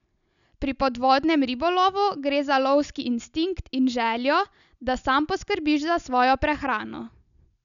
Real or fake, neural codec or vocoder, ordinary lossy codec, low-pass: real; none; none; 7.2 kHz